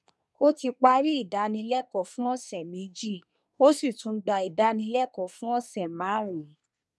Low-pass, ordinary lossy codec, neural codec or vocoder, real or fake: none; none; codec, 24 kHz, 1 kbps, SNAC; fake